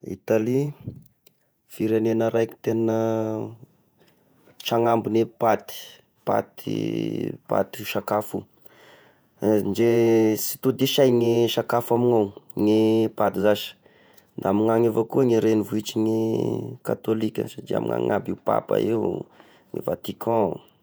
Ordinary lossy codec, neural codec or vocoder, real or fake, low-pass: none; vocoder, 48 kHz, 128 mel bands, Vocos; fake; none